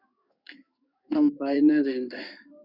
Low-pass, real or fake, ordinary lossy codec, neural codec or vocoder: 5.4 kHz; fake; Opus, 64 kbps; codec, 16 kHz in and 24 kHz out, 1 kbps, XY-Tokenizer